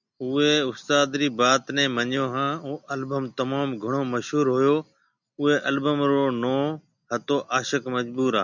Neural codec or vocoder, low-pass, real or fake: none; 7.2 kHz; real